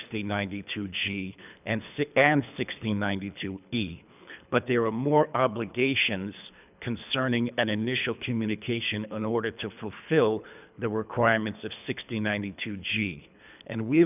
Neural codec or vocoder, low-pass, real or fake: codec, 24 kHz, 3 kbps, HILCodec; 3.6 kHz; fake